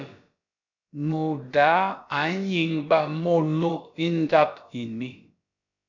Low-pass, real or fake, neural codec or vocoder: 7.2 kHz; fake; codec, 16 kHz, about 1 kbps, DyCAST, with the encoder's durations